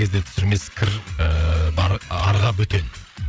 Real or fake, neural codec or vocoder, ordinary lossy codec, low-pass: fake; codec, 16 kHz, 8 kbps, FreqCodec, larger model; none; none